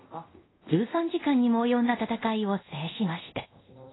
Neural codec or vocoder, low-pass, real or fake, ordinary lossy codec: codec, 24 kHz, 0.5 kbps, DualCodec; 7.2 kHz; fake; AAC, 16 kbps